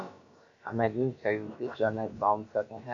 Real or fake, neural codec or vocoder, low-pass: fake; codec, 16 kHz, about 1 kbps, DyCAST, with the encoder's durations; 7.2 kHz